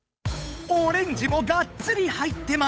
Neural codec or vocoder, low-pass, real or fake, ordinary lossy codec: codec, 16 kHz, 8 kbps, FunCodec, trained on Chinese and English, 25 frames a second; none; fake; none